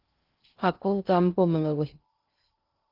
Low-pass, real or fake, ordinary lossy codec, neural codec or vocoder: 5.4 kHz; fake; Opus, 32 kbps; codec, 16 kHz in and 24 kHz out, 0.6 kbps, FocalCodec, streaming, 2048 codes